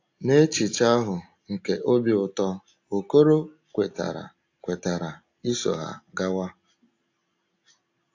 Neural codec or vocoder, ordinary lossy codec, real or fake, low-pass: none; AAC, 48 kbps; real; 7.2 kHz